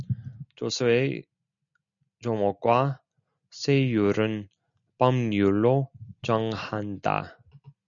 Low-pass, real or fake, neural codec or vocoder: 7.2 kHz; real; none